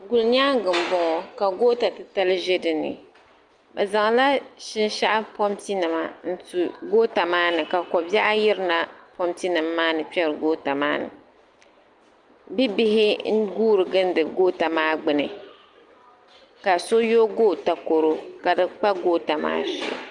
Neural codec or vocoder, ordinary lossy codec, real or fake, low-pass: none; Opus, 32 kbps; real; 10.8 kHz